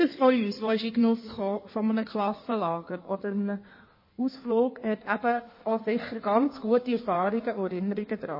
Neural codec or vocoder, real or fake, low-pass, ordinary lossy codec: codec, 16 kHz in and 24 kHz out, 1.1 kbps, FireRedTTS-2 codec; fake; 5.4 kHz; MP3, 24 kbps